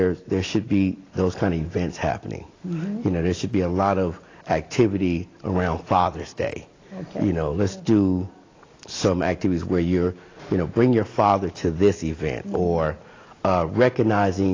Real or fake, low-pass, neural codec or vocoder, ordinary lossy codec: real; 7.2 kHz; none; AAC, 32 kbps